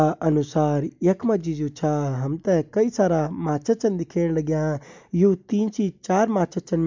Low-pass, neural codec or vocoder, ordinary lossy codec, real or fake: 7.2 kHz; none; MP3, 64 kbps; real